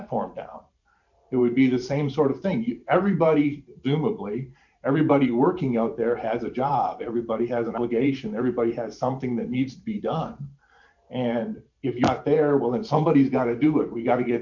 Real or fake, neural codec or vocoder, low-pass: fake; vocoder, 44.1 kHz, 128 mel bands every 512 samples, BigVGAN v2; 7.2 kHz